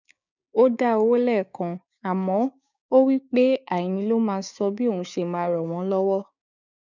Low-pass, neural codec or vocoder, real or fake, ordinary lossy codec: 7.2 kHz; codec, 16 kHz, 6 kbps, DAC; fake; none